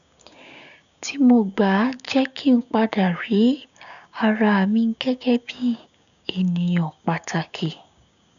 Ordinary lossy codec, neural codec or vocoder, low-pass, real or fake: none; codec, 16 kHz, 6 kbps, DAC; 7.2 kHz; fake